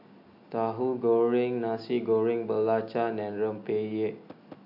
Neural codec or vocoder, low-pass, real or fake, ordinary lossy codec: none; 5.4 kHz; real; AAC, 48 kbps